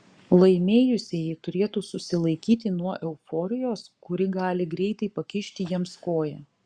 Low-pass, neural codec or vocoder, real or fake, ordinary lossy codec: 9.9 kHz; codec, 44.1 kHz, 7.8 kbps, Pupu-Codec; fake; Opus, 64 kbps